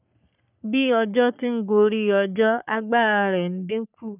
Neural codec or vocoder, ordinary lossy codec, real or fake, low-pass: codec, 44.1 kHz, 3.4 kbps, Pupu-Codec; none; fake; 3.6 kHz